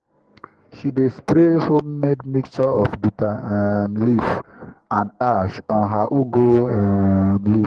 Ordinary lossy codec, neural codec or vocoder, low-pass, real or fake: Opus, 16 kbps; codec, 44.1 kHz, 2.6 kbps, SNAC; 10.8 kHz; fake